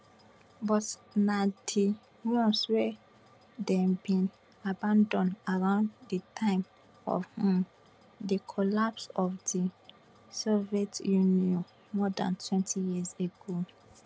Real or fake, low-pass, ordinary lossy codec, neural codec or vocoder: real; none; none; none